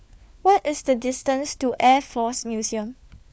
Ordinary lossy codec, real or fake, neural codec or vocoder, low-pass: none; fake; codec, 16 kHz, 4 kbps, FunCodec, trained on LibriTTS, 50 frames a second; none